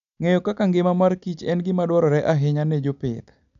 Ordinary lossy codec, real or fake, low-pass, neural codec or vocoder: none; real; 7.2 kHz; none